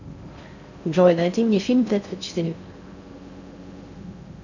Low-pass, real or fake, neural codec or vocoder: 7.2 kHz; fake; codec, 16 kHz in and 24 kHz out, 0.6 kbps, FocalCodec, streaming, 4096 codes